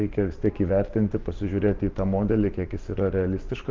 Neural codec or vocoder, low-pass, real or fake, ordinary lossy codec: vocoder, 44.1 kHz, 128 mel bands every 512 samples, BigVGAN v2; 7.2 kHz; fake; Opus, 32 kbps